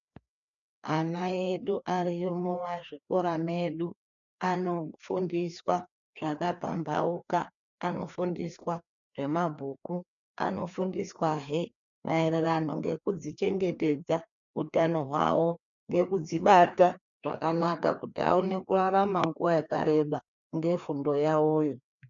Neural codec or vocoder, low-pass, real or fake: codec, 16 kHz, 2 kbps, FreqCodec, larger model; 7.2 kHz; fake